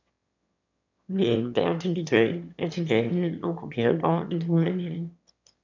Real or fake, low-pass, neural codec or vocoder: fake; 7.2 kHz; autoencoder, 22.05 kHz, a latent of 192 numbers a frame, VITS, trained on one speaker